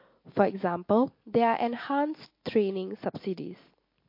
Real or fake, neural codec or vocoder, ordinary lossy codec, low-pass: real; none; MP3, 32 kbps; 5.4 kHz